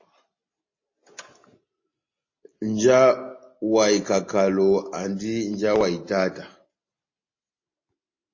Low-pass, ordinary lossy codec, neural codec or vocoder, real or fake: 7.2 kHz; MP3, 32 kbps; none; real